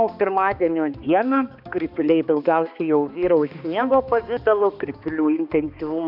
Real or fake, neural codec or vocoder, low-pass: fake; codec, 16 kHz, 2 kbps, X-Codec, HuBERT features, trained on balanced general audio; 5.4 kHz